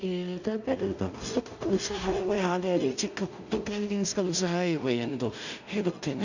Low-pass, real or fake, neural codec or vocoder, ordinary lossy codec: 7.2 kHz; fake; codec, 16 kHz in and 24 kHz out, 0.4 kbps, LongCat-Audio-Codec, two codebook decoder; none